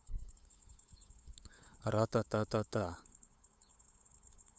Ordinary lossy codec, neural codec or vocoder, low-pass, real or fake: none; codec, 16 kHz, 8 kbps, FunCodec, trained on LibriTTS, 25 frames a second; none; fake